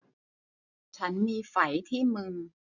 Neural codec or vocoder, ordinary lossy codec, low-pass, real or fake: none; none; 7.2 kHz; real